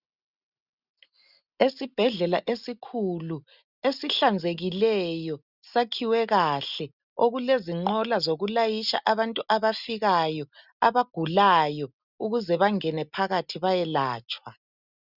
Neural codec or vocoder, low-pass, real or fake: none; 5.4 kHz; real